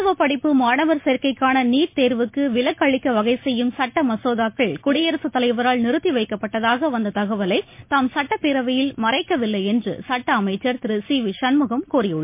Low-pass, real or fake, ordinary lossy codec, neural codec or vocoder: 3.6 kHz; real; MP3, 24 kbps; none